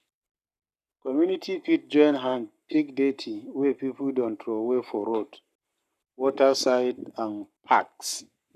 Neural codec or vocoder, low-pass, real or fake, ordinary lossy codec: codec, 44.1 kHz, 7.8 kbps, Pupu-Codec; 14.4 kHz; fake; none